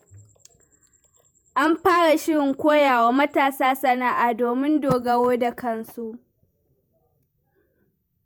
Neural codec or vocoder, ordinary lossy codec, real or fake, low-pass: vocoder, 48 kHz, 128 mel bands, Vocos; none; fake; none